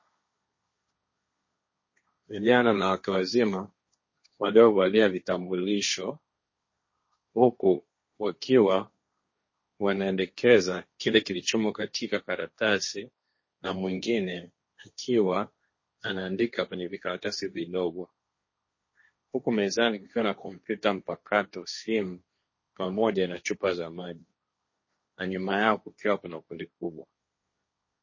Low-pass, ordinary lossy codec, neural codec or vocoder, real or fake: 7.2 kHz; MP3, 32 kbps; codec, 16 kHz, 1.1 kbps, Voila-Tokenizer; fake